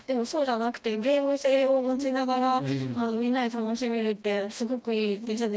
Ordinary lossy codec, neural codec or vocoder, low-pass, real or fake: none; codec, 16 kHz, 1 kbps, FreqCodec, smaller model; none; fake